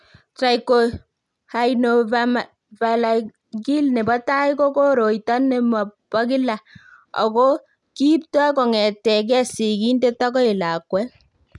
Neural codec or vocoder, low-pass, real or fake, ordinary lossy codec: none; 10.8 kHz; real; none